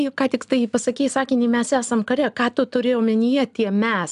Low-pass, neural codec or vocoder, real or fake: 10.8 kHz; none; real